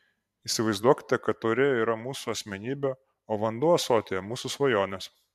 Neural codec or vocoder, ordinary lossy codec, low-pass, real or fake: vocoder, 44.1 kHz, 128 mel bands every 512 samples, BigVGAN v2; MP3, 96 kbps; 14.4 kHz; fake